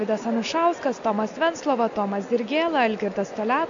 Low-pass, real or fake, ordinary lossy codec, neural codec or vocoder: 7.2 kHz; real; MP3, 64 kbps; none